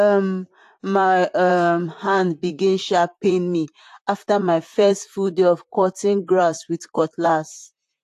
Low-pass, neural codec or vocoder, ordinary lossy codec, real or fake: 14.4 kHz; vocoder, 44.1 kHz, 128 mel bands, Pupu-Vocoder; AAC, 64 kbps; fake